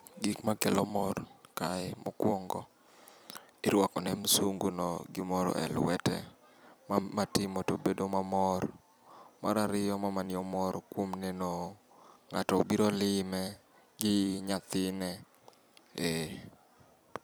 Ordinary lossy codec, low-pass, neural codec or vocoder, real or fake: none; none; vocoder, 44.1 kHz, 128 mel bands every 256 samples, BigVGAN v2; fake